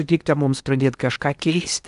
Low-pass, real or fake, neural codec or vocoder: 10.8 kHz; fake; codec, 16 kHz in and 24 kHz out, 0.8 kbps, FocalCodec, streaming, 65536 codes